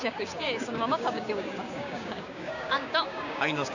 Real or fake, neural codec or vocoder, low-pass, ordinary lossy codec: fake; codec, 16 kHz, 6 kbps, DAC; 7.2 kHz; none